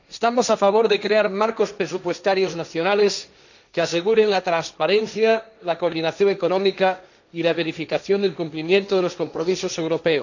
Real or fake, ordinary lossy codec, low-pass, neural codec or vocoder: fake; none; 7.2 kHz; codec, 16 kHz, 1.1 kbps, Voila-Tokenizer